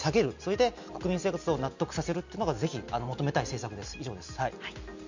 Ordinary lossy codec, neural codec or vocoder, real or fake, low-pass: none; none; real; 7.2 kHz